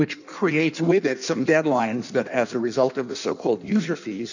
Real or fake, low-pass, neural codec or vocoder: fake; 7.2 kHz; codec, 16 kHz in and 24 kHz out, 1.1 kbps, FireRedTTS-2 codec